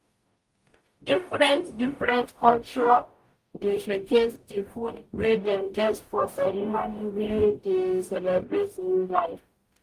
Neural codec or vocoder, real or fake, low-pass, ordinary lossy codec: codec, 44.1 kHz, 0.9 kbps, DAC; fake; 14.4 kHz; Opus, 32 kbps